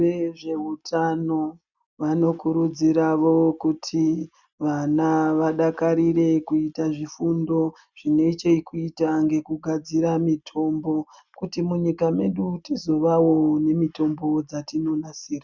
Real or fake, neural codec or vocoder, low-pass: real; none; 7.2 kHz